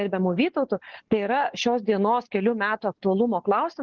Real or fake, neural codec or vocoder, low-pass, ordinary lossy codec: real; none; 7.2 kHz; Opus, 16 kbps